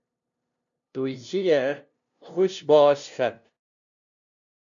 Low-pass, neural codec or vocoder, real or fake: 7.2 kHz; codec, 16 kHz, 0.5 kbps, FunCodec, trained on LibriTTS, 25 frames a second; fake